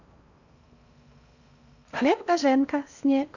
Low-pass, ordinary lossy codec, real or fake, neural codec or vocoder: 7.2 kHz; none; fake; codec, 16 kHz in and 24 kHz out, 0.8 kbps, FocalCodec, streaming, 65536 codes